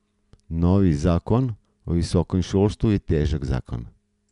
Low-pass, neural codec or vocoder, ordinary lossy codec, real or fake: 10.8 kHz; none; none; real